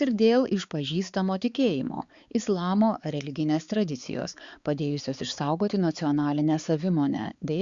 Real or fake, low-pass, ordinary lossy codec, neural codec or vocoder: fake; 7.2 kHz; Opus, 64 kbps; codec, 16 kHz, 4 kbps, X-Codec, HuBERT features, trained on balanced general audio